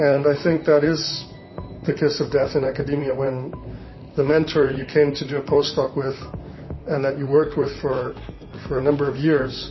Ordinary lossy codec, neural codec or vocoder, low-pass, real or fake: MP3, 24 kbps; vocoder, 44.1 kHz, 128 mel bands, Pupu-Vocoder; 7.2 kHz; fake